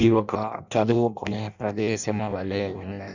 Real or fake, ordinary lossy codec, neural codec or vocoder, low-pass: fake; none; codec, 16 kHz in and 24 kHz out, 0.6 kbps, FireRedTTS-2 codec; 7.2 kHz